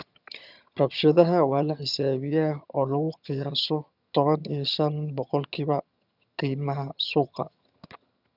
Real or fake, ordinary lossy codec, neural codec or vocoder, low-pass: fake; none; vocoder, 22.05 kHz, 80 mel bands, HiFi-GAN; 5.4 kHz